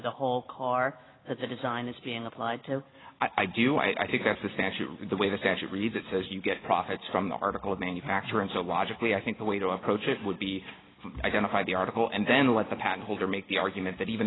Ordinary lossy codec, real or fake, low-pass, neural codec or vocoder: AAC, 16 kbps; real; 7.2 kHz; none